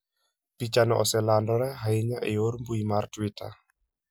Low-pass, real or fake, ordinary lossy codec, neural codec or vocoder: none; real; none; none